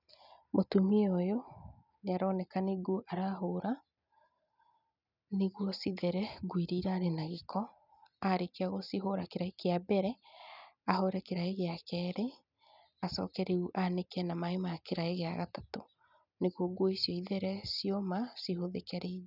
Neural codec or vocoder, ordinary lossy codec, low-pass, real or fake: none; none; 5.4 kHz; real